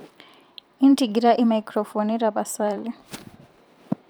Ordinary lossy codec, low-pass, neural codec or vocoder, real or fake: none; none; vocoder, 44.1 kHz, 128 mel bands every 512 samples, BigVGAN v2; fake